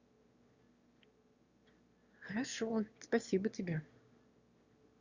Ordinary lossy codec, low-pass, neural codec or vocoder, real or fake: Opus, 64 kbps; 7.2 kHz; autoencoder, 22.05 kHz, a latent of 192 numbers a frame, VITS, trained on one speaker; fake